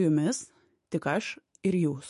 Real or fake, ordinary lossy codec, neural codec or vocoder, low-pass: fake; MP3, 48 kbps; autoencoder, 48 kHz, 128 numbers a frame, DAC-VAE, trained on Japanese speech; 14.4 kHz